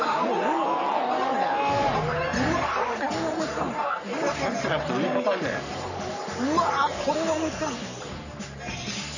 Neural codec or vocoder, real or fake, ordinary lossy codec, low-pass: codec, 44.1 kHz, 3.4 kbps, Pupu-Codec; fake; none; 7.2 kHz